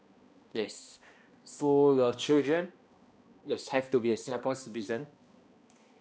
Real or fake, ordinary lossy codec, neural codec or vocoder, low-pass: fake; none; codec, 16 kHz, 1 kbps, X-Codec, HuBERT features, trained on balanced general audio; none